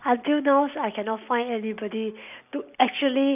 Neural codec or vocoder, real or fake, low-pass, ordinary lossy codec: none; real; 3.6 kHz; none